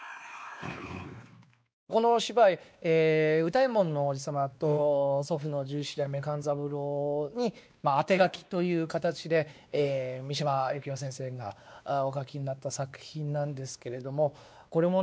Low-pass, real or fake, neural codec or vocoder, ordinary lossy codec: none; fake; codec, 16 kHz, 2 kbps, X-Codec, WavLM features, trained on Multilingual LibriSpeech; none